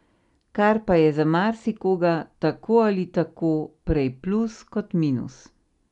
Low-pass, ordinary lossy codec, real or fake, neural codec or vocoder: 10.8 kHz; MP3, 96 kbps; real; none